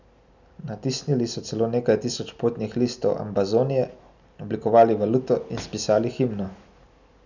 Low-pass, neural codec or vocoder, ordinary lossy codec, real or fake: 7.2 kHz; none; none; real